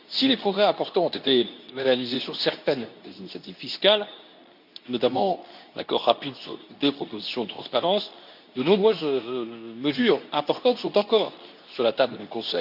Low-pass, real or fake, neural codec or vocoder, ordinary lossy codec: 5.4 kHz; fake; codec, 24 kHz, 0.9 kbps, WavTokenizer, medium speech release version 2; none